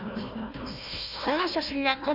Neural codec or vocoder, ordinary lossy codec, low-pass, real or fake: codec, 16 kHz, 1 kbps, FunCodec, trained on Chinese and English, 50 frames a second; none; 5.4 kHz; fake